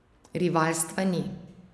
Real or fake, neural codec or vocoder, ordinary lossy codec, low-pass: real; none; none; none